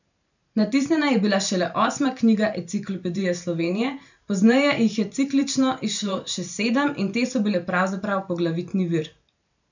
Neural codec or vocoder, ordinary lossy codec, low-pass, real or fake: vocoder, 44.1 kHz, 128 mel bands every 512 samples, BigVGAN v2; none; 7.2 kHz; fake